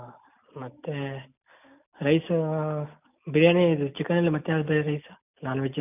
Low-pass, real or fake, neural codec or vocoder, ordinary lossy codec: 3.6 kHz; real; none; none